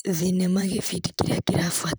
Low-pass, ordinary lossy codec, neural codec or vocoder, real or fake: none; none; vocoder, 44.1 kHz, 128 mel bands, Pupu-Vocoder; fake